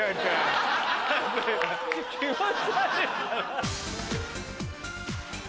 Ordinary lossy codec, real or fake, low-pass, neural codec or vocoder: none; real; none; none